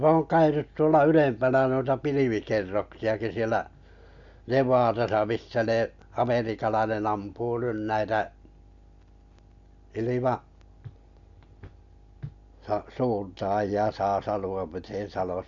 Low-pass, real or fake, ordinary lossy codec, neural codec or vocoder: 7.2 kHz; real; AAC, 64 kbps; none